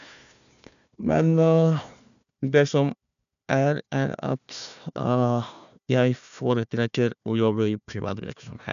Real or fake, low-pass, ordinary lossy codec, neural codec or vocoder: fake; 7.2 kHz; none; codec, 16 kHz, 1 kbps, FunCodec, trained on Chinese and English, 50 frames a second